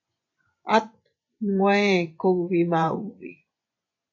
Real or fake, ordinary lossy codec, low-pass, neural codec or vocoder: fake; AAC, 48 kbps; 7.2 kHz; vocoder, 24 kHz, 100 mel bands, Vocos